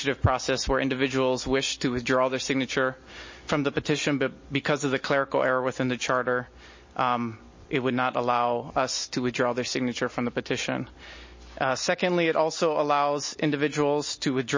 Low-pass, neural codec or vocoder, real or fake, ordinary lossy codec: 7.2 kHz; none; real; MP3, 32 kbps